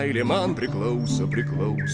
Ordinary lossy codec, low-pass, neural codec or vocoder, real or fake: Opus, 32 kbps; 9.9 kHz; none; real